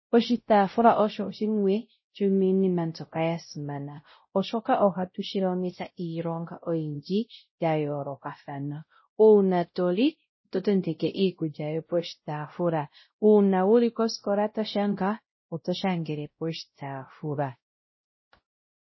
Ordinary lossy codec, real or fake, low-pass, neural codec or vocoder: MP3, 24 kbps; fake; 7.2 kHz; codec, 16 kHz, 0.5 kbps, X-Codec, WavLM features, trained on Multilingual LibriSpeech